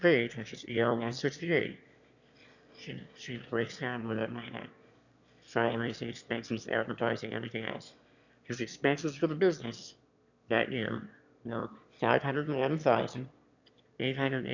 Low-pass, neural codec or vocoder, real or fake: 7.2 kHz; autoencoder, 22.05 kHz, a latent of 192 numbers a frame, VITS, trained on one speaker; fake